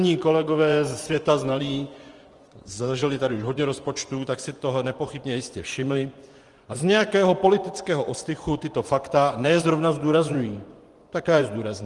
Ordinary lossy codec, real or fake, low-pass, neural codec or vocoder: Opus, 24 kbps; fake; 10.8 kHz; vocoder, 24 kHz, 100 mel bands, Vocos